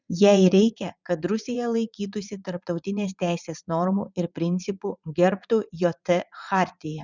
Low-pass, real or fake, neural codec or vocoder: 7.2 kHz; fake; vocoder, 44.1 kHz, 80 mel bands, Vocos